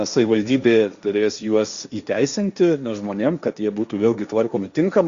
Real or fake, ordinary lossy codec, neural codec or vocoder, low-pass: fake; Opus, 64 kbps; codec, 16 kHz, 1.1 kbps, Voila-Tokenizer; 7.2 kHz